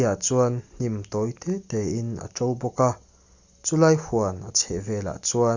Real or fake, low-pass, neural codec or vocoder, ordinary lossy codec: real; 7.2 kHz; none; Opus, 64 kbps